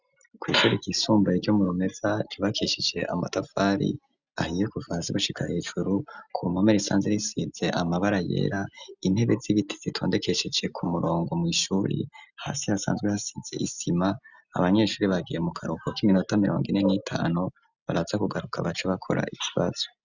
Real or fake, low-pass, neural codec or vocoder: real; 7.2 kHz; none